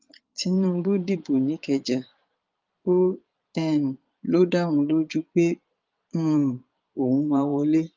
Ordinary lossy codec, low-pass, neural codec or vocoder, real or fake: Opus, 24 kbps; 7.2 kHz; vocoder, 22.05 kHz, 80 mel bands, Vocos; fake